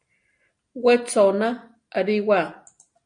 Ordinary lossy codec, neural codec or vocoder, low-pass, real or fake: MP3, 48 kbps; none; 9.9 kHz; real